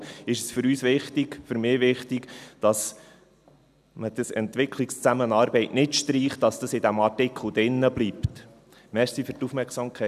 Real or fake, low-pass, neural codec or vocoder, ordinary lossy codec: real; 14.4 kHz; none; none